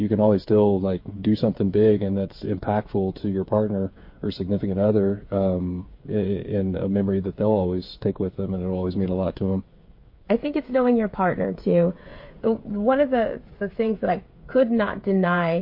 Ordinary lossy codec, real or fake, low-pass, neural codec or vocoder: MP3, 32 kbps; fake; 5.4 kHz; codec, 16 kHz, 8 kbps, FreqCodec, smaller model